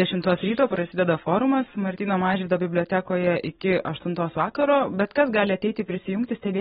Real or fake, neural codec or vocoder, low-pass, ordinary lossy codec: real; none; 19.8 kHz; AAC, 16 kbps